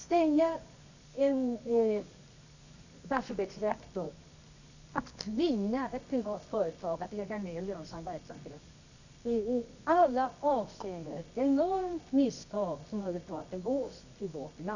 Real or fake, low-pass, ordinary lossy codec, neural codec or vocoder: fake; 7.2 kHz; none; codec, 24 kHz, 0.9 kbps, WavTokenizer, medium music audio release